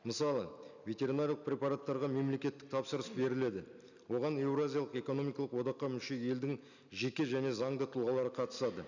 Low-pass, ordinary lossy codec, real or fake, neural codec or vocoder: 7.2 kHz; none; real; none